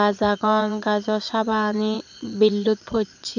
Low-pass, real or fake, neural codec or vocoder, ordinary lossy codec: 7.2 kHz; fake; vocoder, 22.05 kHz, 80 mel bands, Vocos; none